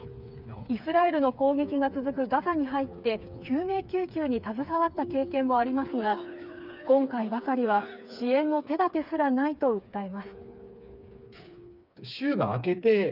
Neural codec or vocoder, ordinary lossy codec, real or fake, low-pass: codec, 16 kHz, 4 kbps, FreqCodec, smaller model; none; fake; 5.4 kHz